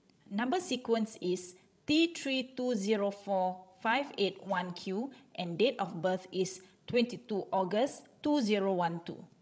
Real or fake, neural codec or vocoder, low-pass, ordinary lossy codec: fake; codec, 16 kHz, 16 kbps, FreqCodec, larger model; none; none